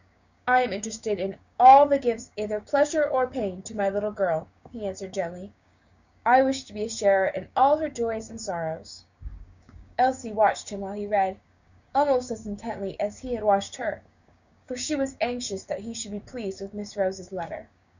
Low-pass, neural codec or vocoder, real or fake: 7.2 kHz; codec, 16 kHz, 6 kbps, DAC; fake